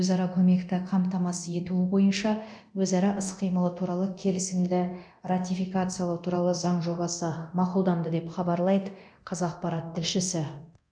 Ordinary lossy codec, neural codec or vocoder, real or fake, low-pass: none; codec, 24 kHz, 0.9 kbps, DualCodec; fake; 9.9 kHz